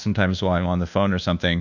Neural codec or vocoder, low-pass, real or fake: codec, 24 kHz, 1.2 kbps, DualCodec; 7.2 kHz; fake